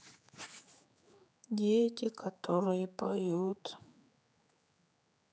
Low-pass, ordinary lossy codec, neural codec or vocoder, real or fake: none; none; codec, 16 kHz, 4 kbps, X-Codec, HuBERT features, trained on general audio; fake